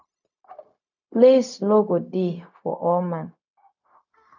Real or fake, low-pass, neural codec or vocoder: fake; 7.2 kHz; codec, 16 kHz, 0.4 kbps, LongCat-Audio-Codec